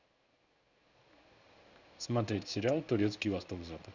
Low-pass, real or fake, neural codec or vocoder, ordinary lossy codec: 7.2 kHz; fake; codec, 16 kHz in and 24 kHz out, 1 kbps, XY-Tokenizer; none